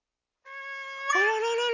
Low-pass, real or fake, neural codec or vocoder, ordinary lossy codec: 7.2 kHz; real; none; none